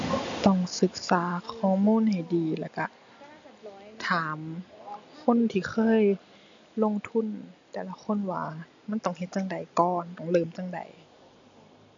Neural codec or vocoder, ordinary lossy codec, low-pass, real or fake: none; MP3, 64 kbps; 7.2 kHz; real